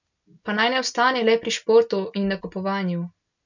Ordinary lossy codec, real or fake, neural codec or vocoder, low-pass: none; real; none; 7.2 kHz